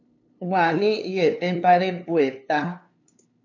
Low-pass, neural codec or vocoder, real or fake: 7.2 kHz; codec, 16 kHz, 2 kbps, FunCodec, trained on LibriTTS, 25 frames a second; fake